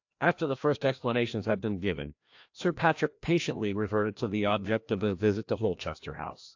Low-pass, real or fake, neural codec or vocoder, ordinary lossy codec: 7.2 kHz; fake; codec, 16 kHz, 1 kbps, FreqCodec, larger model; AAC, 48 kbps